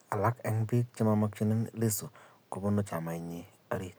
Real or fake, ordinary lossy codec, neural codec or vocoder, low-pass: real; none; none; none